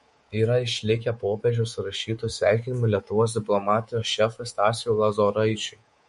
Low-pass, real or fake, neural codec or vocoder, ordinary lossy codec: 10.8 kHz; fake; codec, 24 kHz, 3.1 kbps, DualCodec; MP3, 48 kbps